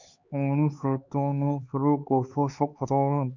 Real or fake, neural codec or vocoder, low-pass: fake; codec, 16 kHz, 4 kbps, X-Codec, HuBERT features, trained on LibriSpeech; 7.2 kHz